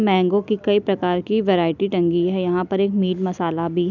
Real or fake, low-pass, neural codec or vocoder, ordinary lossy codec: real; 7.2 kHz; none; none